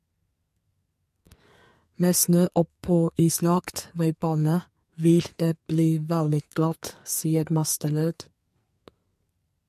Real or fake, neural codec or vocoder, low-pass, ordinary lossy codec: fake; codec, 44.1 kHz, 2.6 kbps, SNAC; 14.4 kHz; MP3, 64 kbps